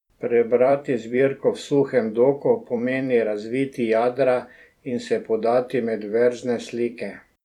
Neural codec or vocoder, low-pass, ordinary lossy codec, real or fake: vocoder, 44.1 kHz, 128 mel bands every 256 samples, BigVGAN v2; 19.8 kHz; none; fake